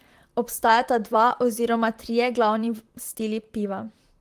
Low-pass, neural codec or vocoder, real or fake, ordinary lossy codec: 14.4 kHz; vocoder, 44.1 kHz, 128 mel bands, Pupu-Vocoder; fake; Opus, 24 kbps